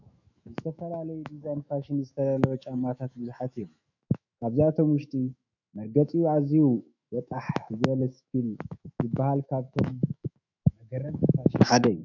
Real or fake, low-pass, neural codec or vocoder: fake; 7.2 kHz; autoencoder, 48 kHz, 128 numbers a frame, DAC-VAE, trained on Japanese speech